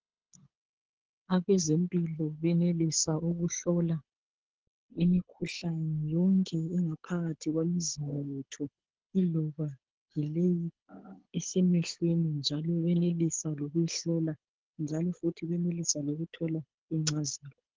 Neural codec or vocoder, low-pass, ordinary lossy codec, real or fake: codec, 24 kHz, 6 kbps, HILCodec; 7.2 kHz; Opus, 16 kbps; fake